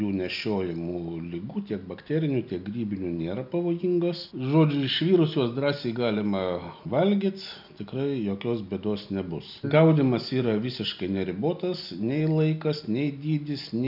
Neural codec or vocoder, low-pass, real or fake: none; 5.4 kHz; real